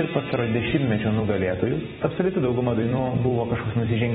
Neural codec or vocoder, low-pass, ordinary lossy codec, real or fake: vocoder, 44.1 kHz, 128 mel bands every 256 samples, BigVGAN v2; 19.8 kHz; AAC, 16 kbps; fake